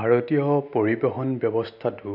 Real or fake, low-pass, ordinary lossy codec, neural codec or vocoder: real; 5.4 kHz; none; none